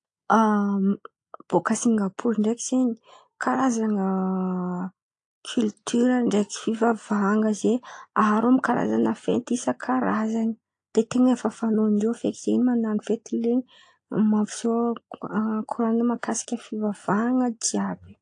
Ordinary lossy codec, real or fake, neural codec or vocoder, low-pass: AAC, 48 kbps; real; none; 9.9 kHz